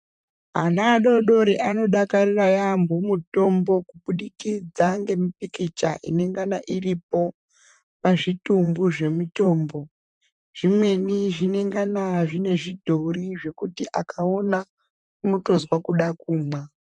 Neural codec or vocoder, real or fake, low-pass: vocoder, 44.1 kHz, 128 mel bands, Pupu-Vocoder; fake; 10.8 kHz